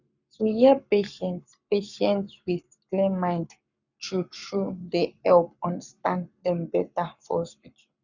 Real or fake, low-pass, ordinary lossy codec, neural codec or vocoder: fake; 7.2 kHz; none; codec, 44.1 kHz, 7.8 kbps, Pupu-Codec